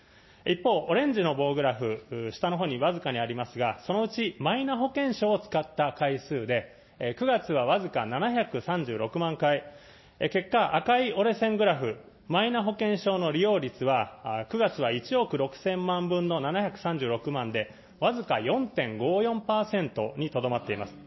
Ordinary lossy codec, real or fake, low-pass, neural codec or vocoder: MP3, 24 kbps; real; 7.2 kHz; none